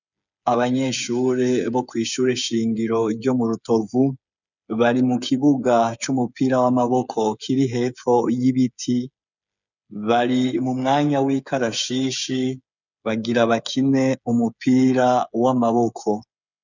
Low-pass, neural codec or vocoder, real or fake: 7.2 kHz; codec, 16 kHz, 8 kbps, FreqCodec, smaller model; fake